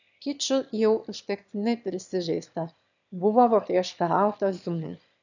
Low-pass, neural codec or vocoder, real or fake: 7.2 kHz; autoencoder, 22.05 kHz, a latent of 192 numbers a frame, VITS, trained on one speaker; fake